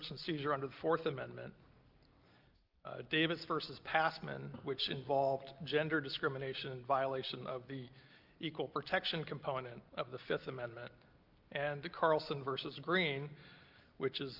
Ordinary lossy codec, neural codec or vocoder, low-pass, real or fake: Opus, 24 kbps; none; 5.4 kHz; real